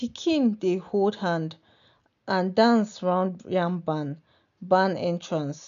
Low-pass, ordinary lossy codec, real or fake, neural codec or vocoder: 7.2 kHz; none; real; none